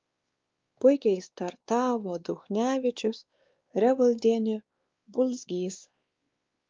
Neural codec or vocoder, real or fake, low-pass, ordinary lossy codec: codec, 16 kHz, 2 kbps, X-Codec, WavLM features, trained on Multilingual LibriSpeech; fake; 7.2 kHz; Opus, 32 kbps